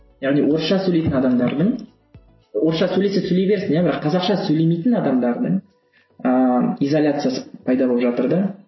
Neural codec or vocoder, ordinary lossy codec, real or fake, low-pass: none; MP3, 24 kbps; real; 7.2 kHz